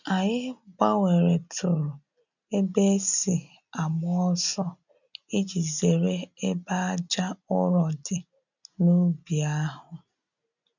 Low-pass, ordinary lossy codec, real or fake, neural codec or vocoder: 7.2 kHz; none; real; none